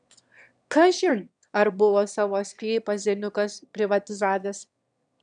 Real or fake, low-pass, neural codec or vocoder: fake; 9.9 kHz; autoencoder, 22.05 kHz, a latent of 192 numbers a frame, VITS, trained on one speaker